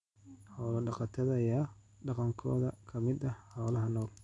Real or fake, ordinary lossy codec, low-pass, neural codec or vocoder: real; none; 10.8 kHz; none